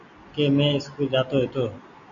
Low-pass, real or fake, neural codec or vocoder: 7.2 kHz; real; none